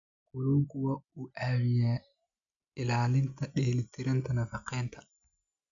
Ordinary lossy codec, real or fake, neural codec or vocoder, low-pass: none; real; none; 7.2 kHz